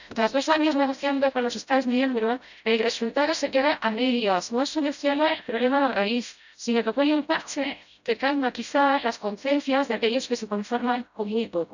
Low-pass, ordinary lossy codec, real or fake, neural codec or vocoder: 7.2 kHz; none; fake; codec, 16 kHz, 0.5 kbps, FreqCodec, smaller model